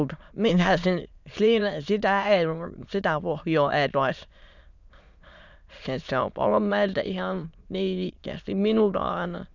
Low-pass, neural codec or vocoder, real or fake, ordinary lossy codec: 7.2 kHz; autoencoder, 22.05 kHz, a latent of 192 numbers a frame, VITS, trained on many speakers; fake; none